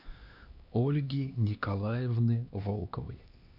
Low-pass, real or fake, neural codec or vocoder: 5.4 kHz; fake; codec, 16 kHz, 2 kbps, X-Codec, HuBERT features, trained on LibriSpeech